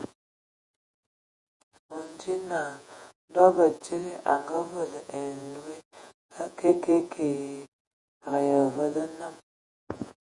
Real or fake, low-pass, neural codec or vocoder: fake; 10.8 kHz; vocoder, 48 kHz, 128 mel bands, Vocos